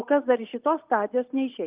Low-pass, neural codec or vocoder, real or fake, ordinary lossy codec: 3.6 kHz; none; real; Opus, 24 kbps